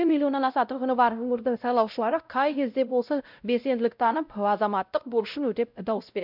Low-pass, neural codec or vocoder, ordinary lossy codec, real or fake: 5.4 kHz; codec, 16 kHz, 0.5 kbps, X-Codec, WavLM features, trained on Multilingual LibriSpeech; none; fake